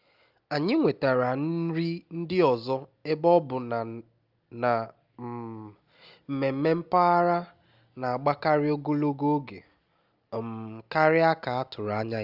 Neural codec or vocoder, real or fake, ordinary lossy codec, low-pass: none; real; Opus, 32 kbps; 5.4 kHz